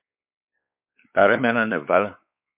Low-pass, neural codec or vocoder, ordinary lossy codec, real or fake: 3.6 kHz; codec, 16 kHz, 4 kbps, X-Codec, WavLM features, trained on Multilingual LibriSpeech; MP3, 32 kbps; fake